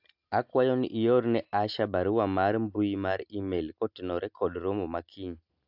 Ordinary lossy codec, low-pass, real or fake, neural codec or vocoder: AAC, 48 kbps; 5.4 kHz; real; none